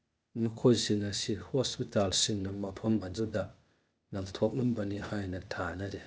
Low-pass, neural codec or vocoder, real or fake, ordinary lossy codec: none; codec, 16 kHz, 0.8 kbps, ZipCodec; fake; none